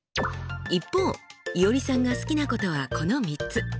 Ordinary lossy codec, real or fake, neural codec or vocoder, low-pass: none; real; none; none